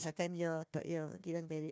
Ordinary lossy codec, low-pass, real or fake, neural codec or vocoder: none; none; fake; codec, 16 kHz, 1 kbps, FunCodec, trained on Chinese and English, 50 frames a second